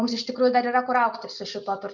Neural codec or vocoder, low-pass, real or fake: none; 7.2 kHz; real